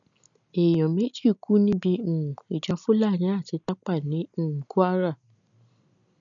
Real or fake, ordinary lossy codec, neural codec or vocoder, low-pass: real; none; none; 7.2 kHz